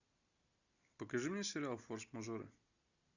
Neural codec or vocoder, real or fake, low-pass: none; real; 7.2 kHz